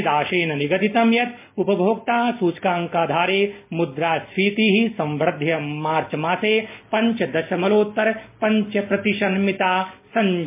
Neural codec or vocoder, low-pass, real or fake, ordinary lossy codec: none; 3.6 kHz; real; MP3, 24 kbps